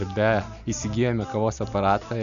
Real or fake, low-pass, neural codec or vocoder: real; 7.2 kHz; none